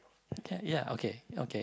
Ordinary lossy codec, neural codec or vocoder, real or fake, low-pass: none; none; real; none